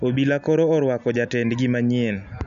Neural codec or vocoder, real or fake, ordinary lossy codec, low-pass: none; real; none; 7.2 kHz